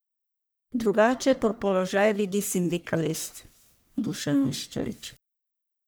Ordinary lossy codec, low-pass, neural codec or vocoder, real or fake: none; none; codec, 44.1 kHz, 1.7 kbps, Pupu-Codec; fake